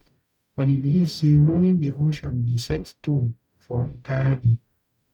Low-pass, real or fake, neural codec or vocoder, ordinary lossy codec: 19.8 kHz; fake; codec, 44.1 kHz, 0.9 kbps, DAC; none